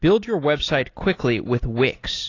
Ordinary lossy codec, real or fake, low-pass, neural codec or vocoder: AAC, 32 kbps; real; 7.2 kHz; none